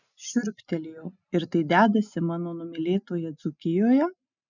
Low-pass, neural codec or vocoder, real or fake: 7.2 kHz; none; real